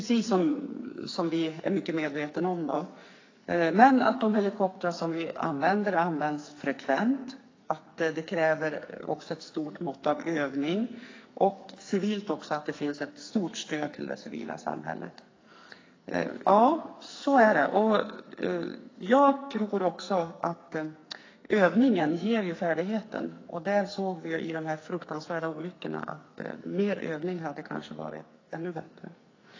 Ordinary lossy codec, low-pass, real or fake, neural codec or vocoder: AAC, 32 kbps; 7.2 kHz; fake; codec, 44.1 kHz, 2.6 kbps, SNAC